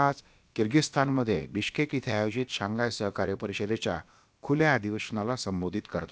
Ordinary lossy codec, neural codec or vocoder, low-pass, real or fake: none; codec, 16 kHz, about 1 kbps, DyCAST, with the encoder's durations; none; fake